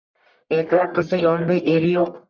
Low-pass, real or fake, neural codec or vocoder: 7.2 kHz; fake; codec, 44.1 kHz, 1.7 kbps, Pupu-Codec